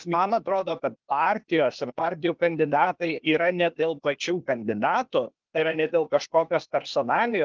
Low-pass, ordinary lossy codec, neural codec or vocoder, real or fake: 7.2 kHz; Opus, 24 kbps; codec, 16 kHz, 0.8 kbps, ZipCodec; fake